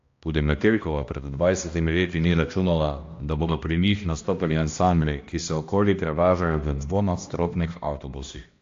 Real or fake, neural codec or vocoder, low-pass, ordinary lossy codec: fake; codec, 16 kHz, 1 kbps, X-Codec, HuBERT features, trained on balanced general audio; 7.2 kHz; AAC, 48 kbps